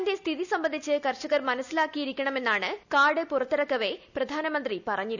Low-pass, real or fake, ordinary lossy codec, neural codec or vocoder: 7.2 kHz; real; none; none